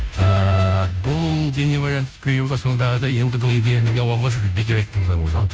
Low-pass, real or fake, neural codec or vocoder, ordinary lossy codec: none; fake; codec, 16 kHz, 0.5 kbps, FunCodec, trained on Chinese and English, 25 frames a second; none